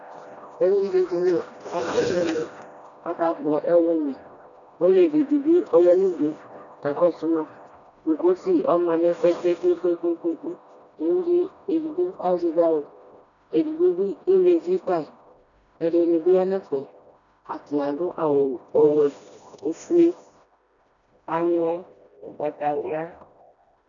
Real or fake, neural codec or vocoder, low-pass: fake; codec, 16 kHz, 1 kbps, FreqCodec, smaller model; 7.2 kHz